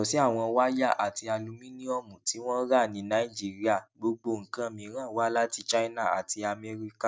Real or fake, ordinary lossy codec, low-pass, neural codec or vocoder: real; none; none; none